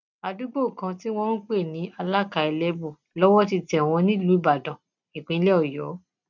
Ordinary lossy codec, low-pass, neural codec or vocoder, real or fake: none; 7.2 kHz; none; real